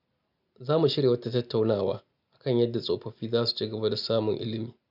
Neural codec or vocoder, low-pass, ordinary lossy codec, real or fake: none; 5.4 kHz; none; real